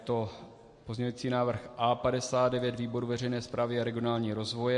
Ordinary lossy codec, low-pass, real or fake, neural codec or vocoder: MP3, 48 kbps; 10.8 kHz; real; none